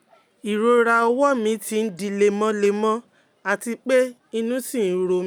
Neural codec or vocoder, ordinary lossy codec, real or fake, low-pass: none; none; real; none